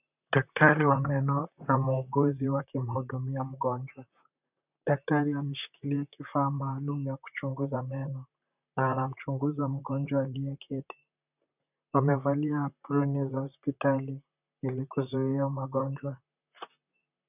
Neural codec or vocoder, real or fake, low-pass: vocoder, 44.1 kHz, 128 mel bands, Pupu-Vocoder; fake; 3.6 kHz